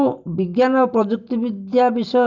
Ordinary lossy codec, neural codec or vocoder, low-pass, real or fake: none; none; 7.2 kHz; real